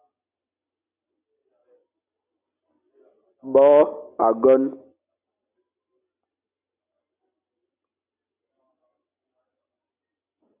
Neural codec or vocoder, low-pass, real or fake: none; 3.6 kHz; real